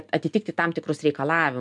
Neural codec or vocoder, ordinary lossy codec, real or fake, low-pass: none; MP3, 96 kbps; real; 10.8 kHz